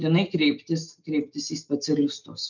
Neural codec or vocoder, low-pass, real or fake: none; 7.2 kHz; real